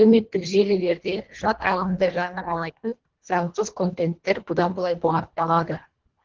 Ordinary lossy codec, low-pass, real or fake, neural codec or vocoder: Opus, 16 kbps; 7.2 kHz; fake; codec, 24 kHz, 1.5 kbps, HILCodec